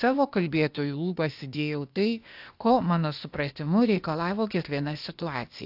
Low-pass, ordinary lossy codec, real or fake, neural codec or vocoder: 5.4 kHz; AAC, 48 kbps; fake; codec, 16 kHz, 0.8 kbps, ZipCodec